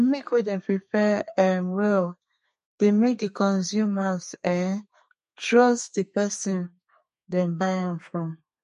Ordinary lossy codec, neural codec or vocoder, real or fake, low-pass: MP3, 48 kbps; codec, 32 kHz, 1.9 kbps, SNAC; fake; 14.4 kHz